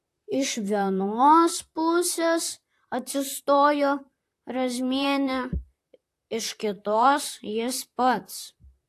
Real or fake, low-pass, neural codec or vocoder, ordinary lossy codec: fake; 14.4 kHz; vocoder, 44.1 kHz, 128 mel bands, Pupu-Vocoder; AAC, 64 kbps